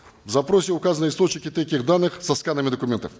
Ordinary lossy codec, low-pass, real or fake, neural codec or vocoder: none; none; real; none